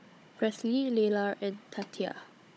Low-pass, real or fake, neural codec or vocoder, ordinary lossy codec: none; fake; codec, 16 kHz, 16 kbps, FunCodec, trained on Chinese and English, 50 frames a second; none